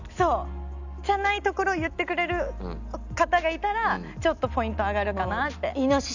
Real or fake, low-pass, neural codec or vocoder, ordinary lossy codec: real; 7.2 kHz; none; none